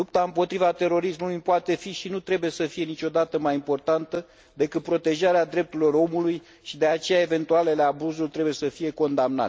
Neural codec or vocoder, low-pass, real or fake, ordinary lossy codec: none; none; real; none